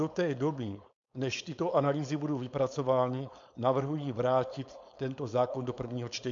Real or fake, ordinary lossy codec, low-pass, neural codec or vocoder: fake; MP3, 64 kbps; 7.2 kHz; codec, 16 kHz, 4.8 kbps, FACodec